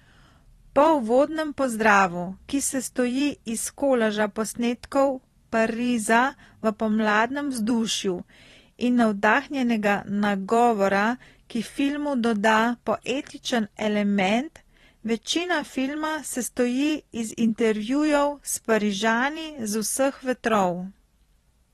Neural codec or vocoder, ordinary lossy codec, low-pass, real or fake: vocoder, 44.1 kHz, 128 mel bands every 512 samples, BigVGAN v2; AAC, 32 kbps; 19.8 kHz; fake